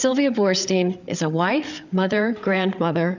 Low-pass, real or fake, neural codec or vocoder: 7.2 kHz; fake; codec, 16 kHz, 8 kbps, FreqCodec, larger model